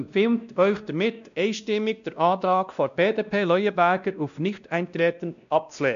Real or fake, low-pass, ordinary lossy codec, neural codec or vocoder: fake; 7.2 kHz; none; codec, 16 kHz, 1 kbps, X-Codec, WavLM features, trained on Multilingual LibriSpeech